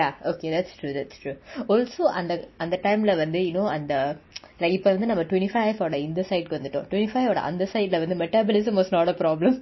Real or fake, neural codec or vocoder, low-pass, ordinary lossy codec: real; none; 7.2 kHz; MP3, 24 kbps